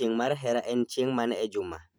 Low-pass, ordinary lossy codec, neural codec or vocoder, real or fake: none; none; vocoder, 44.1 kHz, 128 mel bands every 512 samples, BigVGAN v2; fake